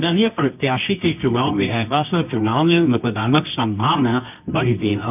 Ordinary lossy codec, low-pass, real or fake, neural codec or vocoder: none; 3.6 kHz; fake; codec, 24 kHz, 0.9 kbps, WavTokenizer, medium music audio release